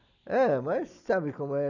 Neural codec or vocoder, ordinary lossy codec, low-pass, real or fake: none; none; 7.2 kHz; real